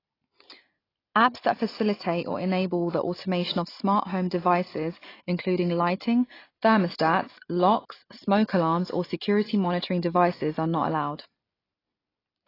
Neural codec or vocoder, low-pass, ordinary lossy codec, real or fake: none; 5.4 kHz; AAC, 24 kbps; real